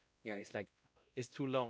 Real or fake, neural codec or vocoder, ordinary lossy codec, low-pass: fake; codec, 16 kHz, 1 kbps, X-Codec, WavLM features, trained on Multilingual LibriSpeech; none; none